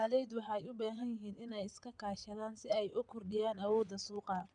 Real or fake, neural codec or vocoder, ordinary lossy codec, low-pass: fake; vocoder, 22.05 kHz, 80 mel bands, WaveNeXt; none; 9.9 kHz